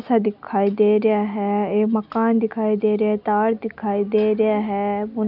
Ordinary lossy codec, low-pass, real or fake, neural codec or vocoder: none; 5.4 kHz; real; none